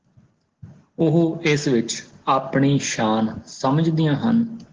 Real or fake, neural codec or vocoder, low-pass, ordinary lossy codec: real; none; 7.2 kHz; Opus, 16 kbps